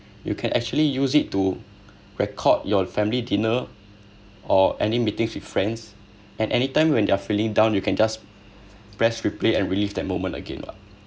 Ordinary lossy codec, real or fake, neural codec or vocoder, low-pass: none; real; none; none